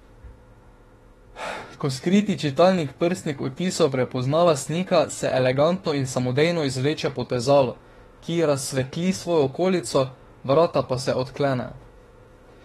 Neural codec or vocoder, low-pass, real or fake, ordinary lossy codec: autoencoder, 48 kHz, 32 numbers a frame, DAC-VAE, trained on Japanese speech; 19.8 kHz; fake; AAC, 32 kbps